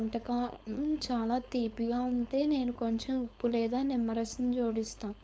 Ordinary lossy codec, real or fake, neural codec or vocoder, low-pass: none; fake; codec, 16 kHz, 4.8 kbps, FACodec; none